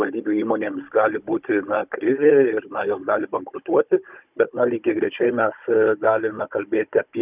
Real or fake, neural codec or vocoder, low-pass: fake; codec, 16 kHz, 16 kbps, FunCodec, trained on Chinese and English, 50 frames a second; 3.6 kHz